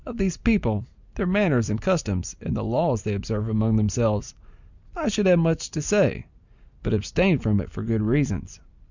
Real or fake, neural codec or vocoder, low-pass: real; none; 7.2 kHz